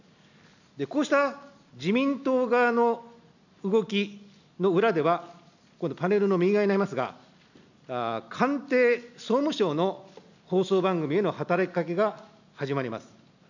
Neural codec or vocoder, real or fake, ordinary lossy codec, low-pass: none; real; none; 7.2 kHz